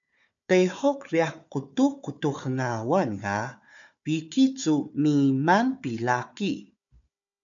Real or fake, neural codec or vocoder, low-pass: fake; codec, 16 kHz, 4 kbps, FunCodec, trained on Chinese and English, 50 frames a second; 7.2 kHz